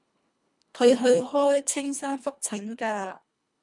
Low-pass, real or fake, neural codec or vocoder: 10.8 kHz; fake; codec, 24 kHz, 1.5 kbps, HILCodec